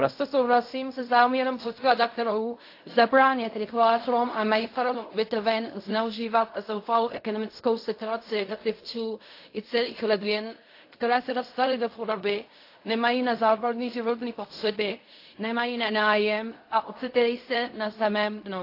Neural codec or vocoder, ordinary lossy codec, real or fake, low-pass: codec, 16 kHz in and 24 kHz out, 0.4 kbps, LongCat-Audio-Codec, fine tuned four codebook decoder; AAC, 32 kbps; fake; 5.4 kHz